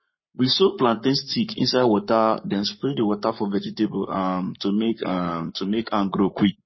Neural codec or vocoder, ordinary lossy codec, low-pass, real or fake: codec, 44.1 kHz, 7.8 kbps, Pupu-Codec; MP3, 24 kbps; 7.2 kHz; fake